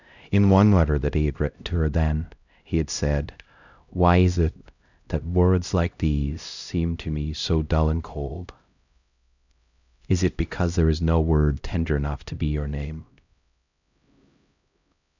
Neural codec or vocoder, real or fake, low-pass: codec, 16 kHz, 0.5 kbps, X-Codec, HuBERT features, trained on LibriSpeech; fake; 7.2 kHz